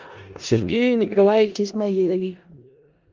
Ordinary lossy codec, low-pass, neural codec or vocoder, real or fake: Opus, 32 kbps; 7.2 kHz; codec, 16 kHz in and 24 kHz out, 0.4 kbps, LongCat-Audio-Codec, four codebook decoder; fake